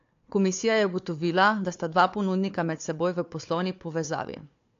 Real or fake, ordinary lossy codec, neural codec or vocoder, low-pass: fake; AAC, 48 kbps; codec, 16 kHz, 4 kbps, FunCodec, trained on Chinese and English, 50 frames a second; 7.2 kHz